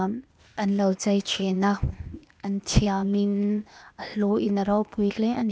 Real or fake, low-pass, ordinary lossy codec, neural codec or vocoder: fake; none; none; codec, 16 kHz, 0.8 kbps, ZipCodec